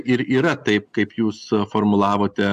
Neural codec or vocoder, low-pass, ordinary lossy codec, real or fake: none; 14.4 kHz; AAC, 96 kbps; real